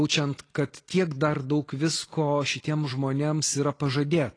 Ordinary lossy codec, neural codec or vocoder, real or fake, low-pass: AAC, 32 kbps; none; real; 9.9 kHz